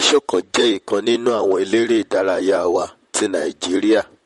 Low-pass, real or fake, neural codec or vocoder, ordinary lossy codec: 19.8 kHz; fake; vocoder, 44.1 kHz, 128 mel bands, Pupu-Vocoder; MP3, 48 kbps